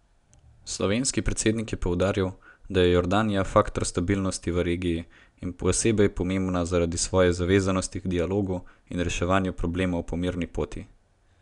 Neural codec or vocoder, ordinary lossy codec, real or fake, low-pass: none; none; real; 10.8 kHz